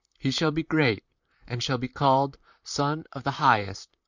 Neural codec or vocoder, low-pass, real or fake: vocoder, 44.1 kHz, 128 mel bands, Pupu-Vocoder; 7.2 kHz; fake